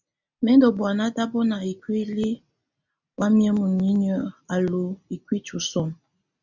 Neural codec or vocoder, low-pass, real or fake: none; 7.2 kHz; real